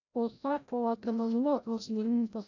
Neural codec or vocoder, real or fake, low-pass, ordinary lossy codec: codec, 16 kHz, 0.5 kbps, FreqCodec, larger model; fake; 7.2 kHz; none